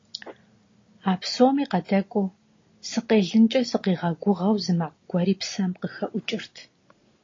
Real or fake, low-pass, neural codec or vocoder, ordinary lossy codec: real; 7.2 kHz; none; AAC, 32 kbps